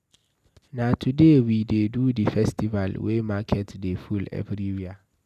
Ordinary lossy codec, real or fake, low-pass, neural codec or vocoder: none; real; 14.4 kHz; none